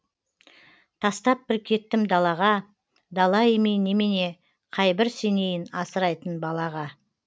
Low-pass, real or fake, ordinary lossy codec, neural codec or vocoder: none; real; none; none